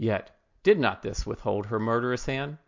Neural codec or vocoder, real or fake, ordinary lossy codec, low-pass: none; real; MP3, 48 kbps; 7.2 kHz